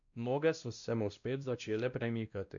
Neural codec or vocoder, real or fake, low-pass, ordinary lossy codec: codec, 16 kHz, 1 kbps, X-Codec, WavLM features, trained on Multilingual LibriSpeech; fake; 7.2 kHz; none